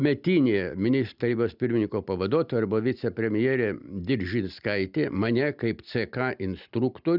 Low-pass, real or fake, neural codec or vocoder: 5.4 kHz; real; none